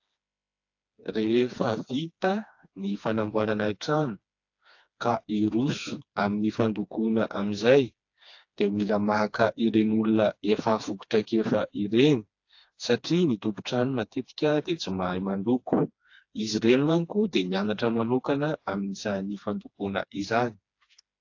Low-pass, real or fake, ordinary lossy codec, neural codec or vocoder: 7.2 kHz; fake; AAC, 48 kbps; codec, 16 kHz, 2 kbps, FreqCodec, smaller model